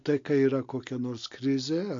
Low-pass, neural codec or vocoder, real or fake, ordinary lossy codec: 7.2 kHz; none; real; MP3, 48 kbps